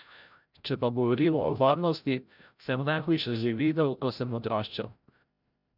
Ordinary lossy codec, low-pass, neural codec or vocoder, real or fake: none; 5.4 kHz; codec, 16 kHz, 0.5 kbps, FreqCodec, larger model; fake